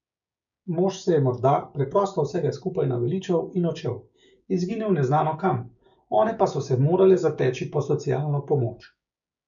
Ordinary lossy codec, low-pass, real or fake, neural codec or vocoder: none; 7.2 kHz; fake; codec, 16 kHz, 6 kbps, DAC